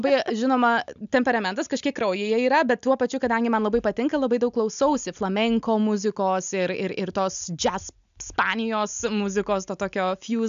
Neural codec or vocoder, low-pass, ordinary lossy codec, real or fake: none; 7.2 kHz; AAC, 96 kbps; real